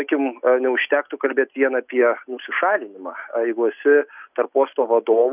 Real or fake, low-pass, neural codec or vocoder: real; 3.6 kHz; none